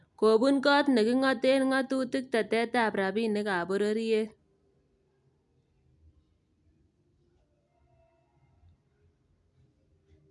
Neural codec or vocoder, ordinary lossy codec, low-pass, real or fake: none; none; 10.8 kHz; real